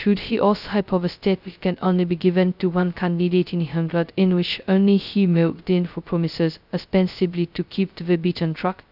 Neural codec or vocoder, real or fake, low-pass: codec, 16 kHz, 0.2 kbps, FocalCodec; fake; 5.4 kHz